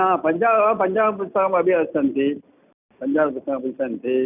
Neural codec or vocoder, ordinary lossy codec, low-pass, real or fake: none; none; 3.6 kHz; real